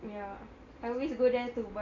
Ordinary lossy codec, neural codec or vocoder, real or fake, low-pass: AAC, 32 kbps; none; real; 7.2 kHz